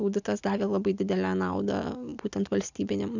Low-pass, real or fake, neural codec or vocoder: 7.2 kHz; real; none